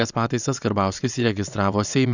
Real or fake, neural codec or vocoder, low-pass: fake; vocoder, 22.05 kHz, 80 mel bands, WaveNeXt; 7.2 kHz